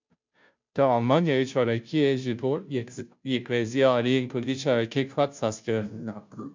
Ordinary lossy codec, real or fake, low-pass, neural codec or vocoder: MP3, 64 kbps; fake; 7.2 kHz; codec, 16 kHz, 0.5 kbps, FunCodec, trained on Chinese and English, 25 frames a second